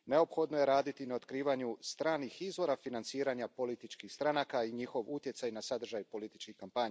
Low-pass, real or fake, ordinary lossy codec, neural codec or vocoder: none; real; none; none